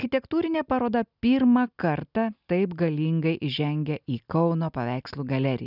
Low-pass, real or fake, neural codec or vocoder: 5.4 kHz; real; none